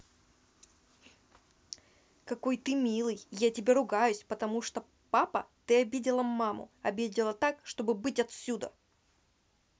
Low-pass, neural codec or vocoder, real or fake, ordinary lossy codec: none; none; real; none